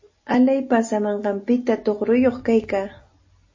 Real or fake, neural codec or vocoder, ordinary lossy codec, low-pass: real; none; MP3, 32 kbps; 7.2 kHz